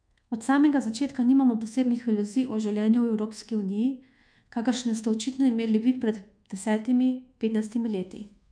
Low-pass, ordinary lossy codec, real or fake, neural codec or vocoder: 9.9 kHz; MP3, 64 kbps; fake; codec, 24 kHz, 1.2 kbps, DualCodec